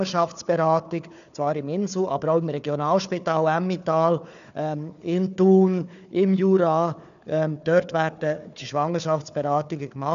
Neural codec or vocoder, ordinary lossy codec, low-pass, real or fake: codec, 16 kHz, 4 kbps, FunCodec, trained on Chinese and English, 50 frames a second; none; 7.2 kHz; fake